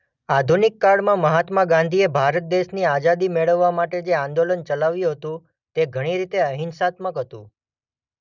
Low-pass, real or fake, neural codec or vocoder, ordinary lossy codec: 7.2 kHz; real; none; none